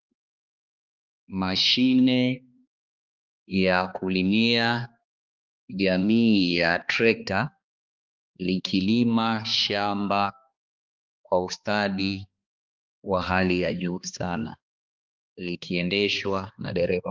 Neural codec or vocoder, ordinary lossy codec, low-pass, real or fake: codec, 16 kHz, 2 kbps, X-Codec, HuBERT features, trained on balanced general audio; Opus, 32 kbps; 7.2 kHz; fake